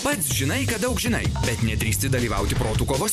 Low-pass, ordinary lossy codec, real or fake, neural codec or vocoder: 14.4 kHz; MP3, 96 kbps; fake; vocoder, 44.1 kHz, 128 mel bands every 512 samples, BigVGAN v2